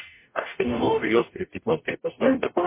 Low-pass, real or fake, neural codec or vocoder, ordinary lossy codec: 3.6 kHz; fake; codec, 44.1 kHz, 0.9 kbps, DAC; MP3, 24 kbps